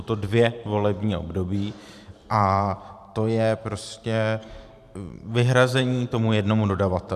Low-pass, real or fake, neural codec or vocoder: 14.4 kHz; fake; vocoder, 44.1 kHz, 128 mel bands every 512 samples, BigVGAN v2